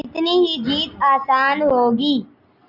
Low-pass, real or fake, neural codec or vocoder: 5.4 kHz; real; none